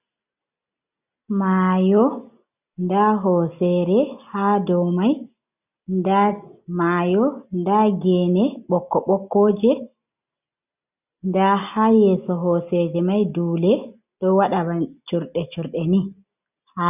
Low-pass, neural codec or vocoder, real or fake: 3.6 kHz; none; real